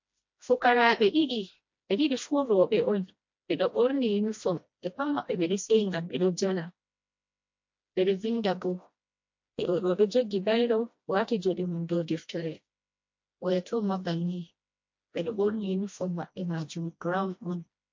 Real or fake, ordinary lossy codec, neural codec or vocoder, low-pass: fake; MP3, 48 kbps; codec, 16 kHz, 1 kbps, FreqCodec, smaller model; 7.2 kHz